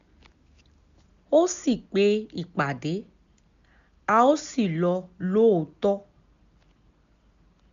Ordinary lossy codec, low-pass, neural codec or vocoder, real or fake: none; 7.2 kHz; none; real